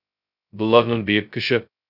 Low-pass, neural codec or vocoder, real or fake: 5.4 kHz; codec, 16 kHz, 0.2 kbps, FocalCodec; fake